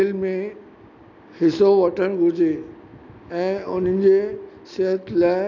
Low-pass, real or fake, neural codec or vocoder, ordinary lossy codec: 7.2 kHz; real; none; none